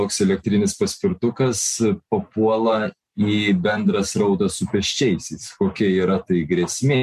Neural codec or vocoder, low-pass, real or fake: none; 14.4 kHz; real